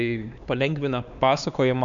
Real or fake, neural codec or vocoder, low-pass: fake; codec, 16 kHz, 4 kbps, X-Codec, HuBERT features, trained on LibriSpeech; 7.2 kHz